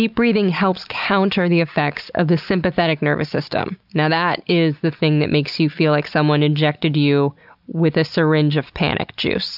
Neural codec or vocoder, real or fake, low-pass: none; real; 5.4 kHz